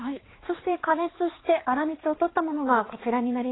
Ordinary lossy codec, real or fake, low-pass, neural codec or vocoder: AAC, 16 kbps; fake; 7.2 kHz; codec, 16 kHz, 4 kbps, X-Codec, HuBERT features, trained on balanced general audio